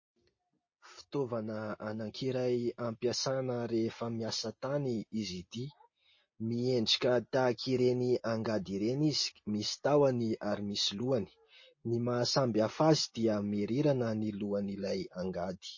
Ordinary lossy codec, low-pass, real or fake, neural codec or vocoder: MP3, 32 kbps; 7.2 kHz; real; none